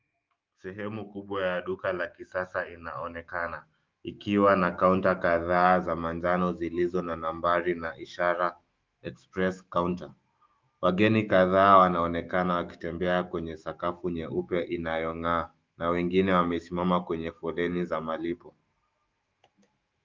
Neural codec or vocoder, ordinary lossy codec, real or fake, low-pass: autoencoder, 48 kHz, 128 numbers a frame, DAC-VAE, trained on Japanese speech; Opus, 32 kbps; fake; 7.2 kHz